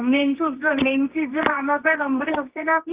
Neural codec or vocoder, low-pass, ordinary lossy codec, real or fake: codec, 24 kHz, 0.9 kbps, WavTokenizer, medium music audio release; 3.6 kHz; Opus, 24 kbps; fake